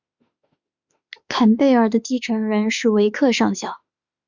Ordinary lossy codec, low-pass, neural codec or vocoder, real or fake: Opus, 64 kbps; 7.2 kHz; autoencoder, 48 kHz, 32 numbers a frame, DAC-VAE, trained on Japanese speech; fake